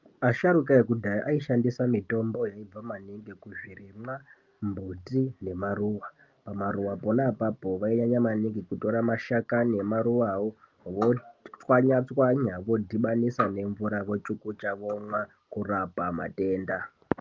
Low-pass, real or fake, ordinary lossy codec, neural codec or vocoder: 7.2 kHz; real; Opus, 24 kbps; none